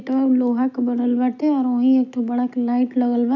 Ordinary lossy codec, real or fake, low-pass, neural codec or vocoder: AAC, 48 kbps; real; 7.2 kHz; none